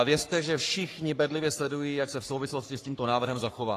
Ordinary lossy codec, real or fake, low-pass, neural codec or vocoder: AAC, 48 kbps; fake; 14.4 kHz; codec, 44.1 kHz, 3.4 kbps, Pupu-Codec